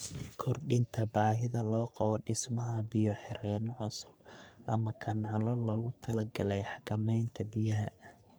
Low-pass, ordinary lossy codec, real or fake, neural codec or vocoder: none; none; fake; codec, 44.1 kHz, 3.4 kbps, Pupu-Codec